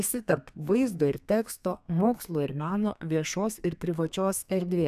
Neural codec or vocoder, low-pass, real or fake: codec, 32 kHz, 1.9 kbps, SNAC; 14.4 kHz; fake